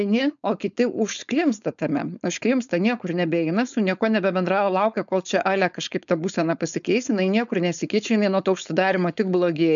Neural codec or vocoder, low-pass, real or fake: codec, 16 kHz, 4.8 kbps, FACodec; 7.2 kHz; fake